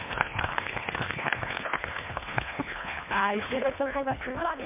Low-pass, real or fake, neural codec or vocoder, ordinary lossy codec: 3.6 kHz; fake; codec, 24 kHz, 1.5 kbps, HILCodec; MP3, 24 kbps